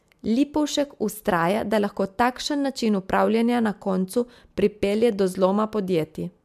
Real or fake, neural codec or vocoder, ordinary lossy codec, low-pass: real; none; MP3, 96 kbps; 14.4 kHz